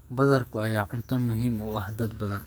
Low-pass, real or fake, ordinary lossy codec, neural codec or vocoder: none; fake; none; codec, 44.1 kHz, 2.6 kbps, SNAC